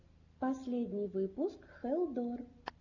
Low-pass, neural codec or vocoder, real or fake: 7.2 kHz; none; real